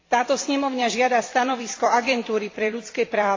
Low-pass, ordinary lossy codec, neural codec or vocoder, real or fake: 7.2 kHz; AAC, 32 kbps; vocoder, 44.1 kHz, 128 mel bands every 256 samples, BigVGAN v2; fake